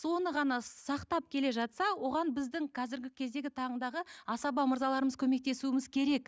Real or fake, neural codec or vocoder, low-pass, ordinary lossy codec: real; none; none; none